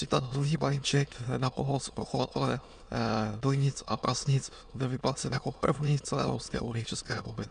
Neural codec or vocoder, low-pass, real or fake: autoencoder, 22.05 kHz, a latent of 192 numbers a frame, VITS, trained on many speakers; 9.9 kHz; fake